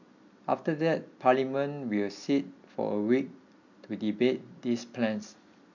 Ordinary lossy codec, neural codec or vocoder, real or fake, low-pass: none; none; real; 7.2 kHz